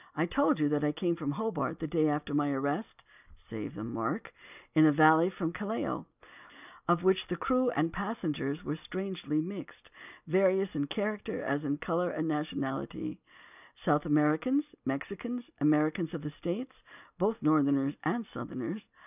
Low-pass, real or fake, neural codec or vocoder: 3.6 kHz; real; none